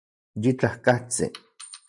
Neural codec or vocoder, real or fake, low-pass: none; real; 10.8 kHz